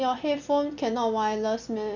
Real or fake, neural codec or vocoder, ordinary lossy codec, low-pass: real; none; none; 7.2 kHz